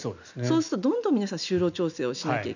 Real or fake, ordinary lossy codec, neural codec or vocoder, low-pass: real; none; none; 7.2 kHz